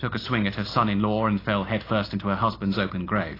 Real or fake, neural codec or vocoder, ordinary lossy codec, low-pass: real; none; AAC, 24 kbps; 5.4 kHz